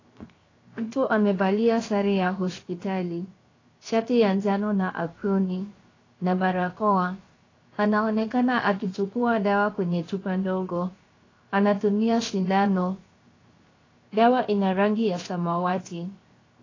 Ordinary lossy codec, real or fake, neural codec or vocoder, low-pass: AAC, 32 kbps; fake; codec, 16 kHz, 0.7 kbps, FocalCodec; 7.2 kHz